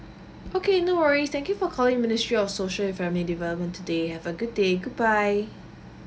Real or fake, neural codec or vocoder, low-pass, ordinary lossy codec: real; none; none; none